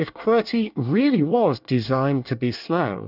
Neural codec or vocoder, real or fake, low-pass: codec, 24 kHz, 1 kbps, SNAC; fake; 5.4 kHz